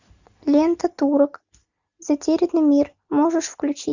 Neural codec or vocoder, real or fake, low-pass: none; real; 7.2 kHz